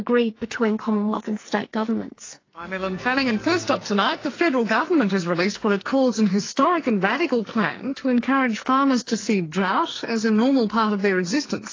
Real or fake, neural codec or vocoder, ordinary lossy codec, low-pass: fake; codec, 44.1 kHz, 2.6 kbps, SNAC; AAC, 32 kbps; 7.2 kHz